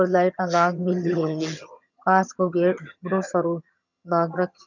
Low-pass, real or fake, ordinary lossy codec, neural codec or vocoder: 7.2 kHz; fake; none; vocoder, 22.05 kHz, 80 mel bands, HiFi-GAN